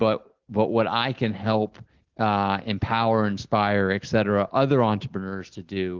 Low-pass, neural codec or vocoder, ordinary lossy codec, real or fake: 7.2 kHz; none; Opus, 16 kbps; real